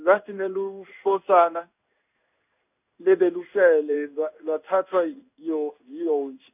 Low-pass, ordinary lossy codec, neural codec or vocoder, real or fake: 3.6 kHz; none; codec, 16 kHz in and 24 kHz out, 1 kbps, XY-Tokenizer; fake